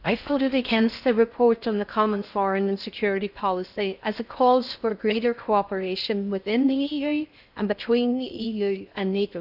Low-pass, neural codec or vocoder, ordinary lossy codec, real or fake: 5.4 kHz; codec, 16 kHz in and 24 kHz out, 0.6 kbps, FocalCodec, streaming, 4096 codes; none; fake